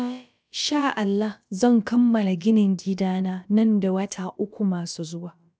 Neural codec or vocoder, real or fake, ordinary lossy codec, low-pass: codec, 16 kHz, about 1 kbps, DyCAST, with the encoder's durations; fake; none; none